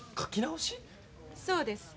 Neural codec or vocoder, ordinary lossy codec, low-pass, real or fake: none; none; none; real